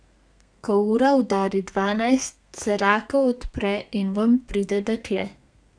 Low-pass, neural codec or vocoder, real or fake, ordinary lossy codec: 9.9 kHz; codec, 32 kHz, 1.9 kbps, SNAC; fake; none